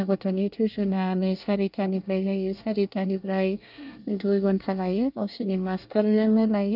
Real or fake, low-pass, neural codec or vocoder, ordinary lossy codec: fake; 5.4 kHz; codec, 24 kHz, 1 kbps, SNAC; none